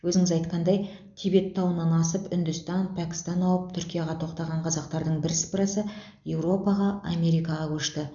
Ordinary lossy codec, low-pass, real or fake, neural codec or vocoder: none; 7.2 kHz; real; none